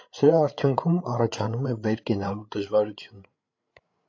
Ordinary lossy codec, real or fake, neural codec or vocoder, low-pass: AAC, 48 kbps; fake; codec, 16 kHz, 8 kbps, FreqCodec, larger model; 7.2 kHz